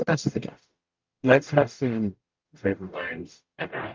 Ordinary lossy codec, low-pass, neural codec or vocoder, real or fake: Opus, 32 kbps; 7.2 kHz; codec, 44.1 kHz, 0.9 kbps, DAC; fake